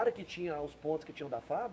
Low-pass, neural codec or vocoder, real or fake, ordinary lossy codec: none; codec, 16 kHz, 6 kbps, DAC; fake; none